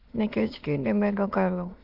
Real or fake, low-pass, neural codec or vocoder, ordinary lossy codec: fake; 5.4 kHz; autoencoder, 22.05 kHz, a latent of 192 numbers a frame, VITS, trained on many speakers; Opus, 24 kbps